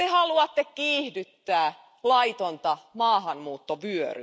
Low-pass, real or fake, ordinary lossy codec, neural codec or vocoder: none; real; none; none